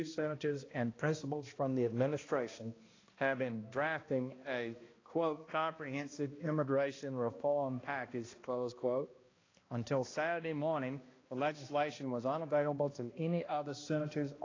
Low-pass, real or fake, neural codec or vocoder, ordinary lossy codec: 7.2 kHz; fake; codec, 16 kHz, 1 kbps, X-Codec, HuBERT features, trained on balanced general audio; AAC, 32 kbps